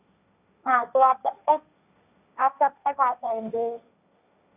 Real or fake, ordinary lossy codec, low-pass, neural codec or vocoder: fake; none; 3.6 kHz; codec, 16 kHz, 1.1 kbps, Voila-Tokenizer